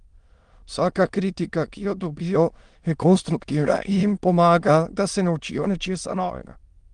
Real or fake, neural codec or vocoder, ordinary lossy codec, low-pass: fake; autoencoder, 22.05 kHz, a latent of 192 numbers a frame, VITS, trained on many speakers; Opus, 24 kbps; 9.9 kHz